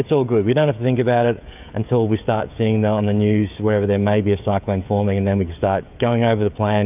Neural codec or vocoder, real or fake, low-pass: codec, 16 kHz, 16 kbps, FreqCodec, smaller model; fake; 3.6 kHz